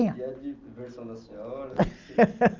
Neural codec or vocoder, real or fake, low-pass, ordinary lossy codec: none; real; 7.2 kHz; Opus, 24 kbps